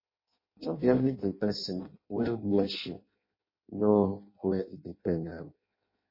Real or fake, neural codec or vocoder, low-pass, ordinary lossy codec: fake; codec, 16 kHz in and 24 kHz out, 0.6 kbps, FireRedTTS-2 codec; 5.4 kHz; MP3, 24 kbps